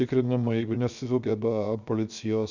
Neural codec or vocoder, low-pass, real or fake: codec, 16 kHz, 0.7 kbps, FocalCodec; 7.2 kHz; fake